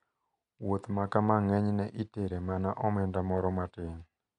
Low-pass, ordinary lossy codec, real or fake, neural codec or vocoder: 10.8 kHz; none; real; none